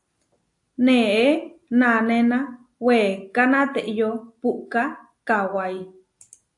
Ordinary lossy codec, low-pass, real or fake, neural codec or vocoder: AAC, 64 kbps; 10.8 kHz; real; none